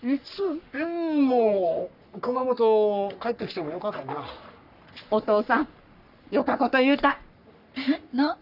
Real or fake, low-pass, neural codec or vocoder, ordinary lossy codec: fake; 5.4 kHz; codec, 44.1 kHz, 3.4 kbps, Pupu-Codec; none